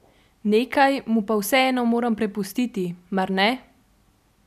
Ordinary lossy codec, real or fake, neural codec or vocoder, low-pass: none; real; none; 14.4 kHz